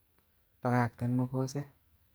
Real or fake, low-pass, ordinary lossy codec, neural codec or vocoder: fake; none; none; codec, 44.1 kHz, 2.6 kbps, SNAC